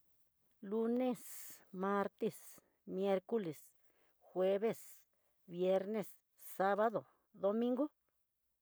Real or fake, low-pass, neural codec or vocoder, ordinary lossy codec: real; none; none; none